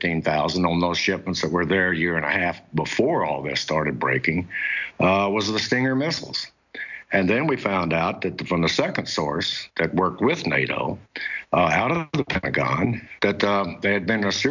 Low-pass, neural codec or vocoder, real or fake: 7.2 kHz; none; real